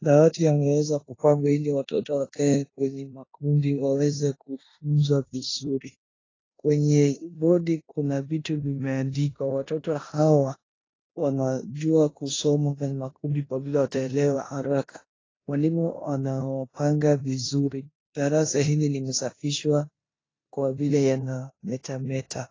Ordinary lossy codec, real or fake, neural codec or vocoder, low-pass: AAC, 32 kbps; fake; codec, 16 kHz in and 24 kHz out, 0.9 kbps, LongCat-Audio-Codec, four codebook decoder; 7.2 kHz